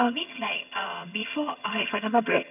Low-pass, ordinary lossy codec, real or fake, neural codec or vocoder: 3.6 kHz; none; fake; vocoder, 22.05 kHz, 80 mel bands, HiFi-GAN